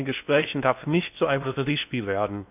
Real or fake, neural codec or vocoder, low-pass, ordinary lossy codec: fake; codec, 16 kHz in and 24 kHz out, 0.6 kbps, FocalCodec, streaming, 4096 codes; 3.6 kHz; none